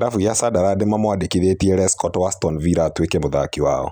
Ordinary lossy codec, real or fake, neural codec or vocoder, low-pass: none; real; none; none